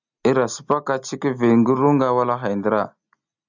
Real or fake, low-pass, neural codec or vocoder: real; 7.2 kHz; none